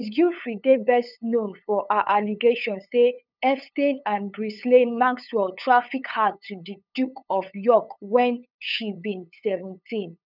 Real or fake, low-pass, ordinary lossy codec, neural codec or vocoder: fake; 5.4 kHz; none; codec, 16 kHz, 8 kbps, FunCodec, trained on LibriTTS, 25 frames a second